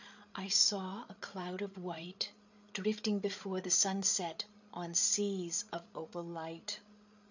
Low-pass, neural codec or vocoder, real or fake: 7.2 kHz; codec, 16 kHz, 8 kbps, FreqCodec, larger model; fake